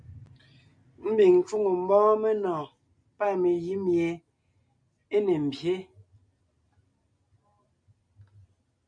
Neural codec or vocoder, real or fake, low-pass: none; real; 9.9 kHz